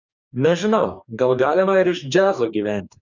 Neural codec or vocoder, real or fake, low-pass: codec, 44.1 kHz, 2.6 kbps, DAC; fake; 7.2 kHz